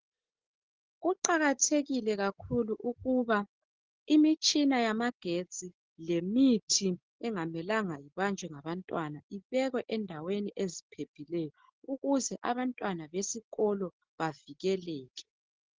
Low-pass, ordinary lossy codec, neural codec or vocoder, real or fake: 7.2 kHz; Opus, 16 kbps; none; real